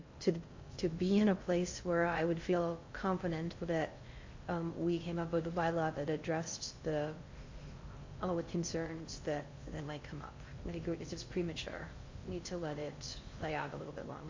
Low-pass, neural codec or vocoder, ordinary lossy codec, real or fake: 7.2 kHz; codec, 16 kHz in and 24 kHz out, 0.6 kbps, FocalCodec, streaming, 2048 codes; MP3, 48 kbps; fake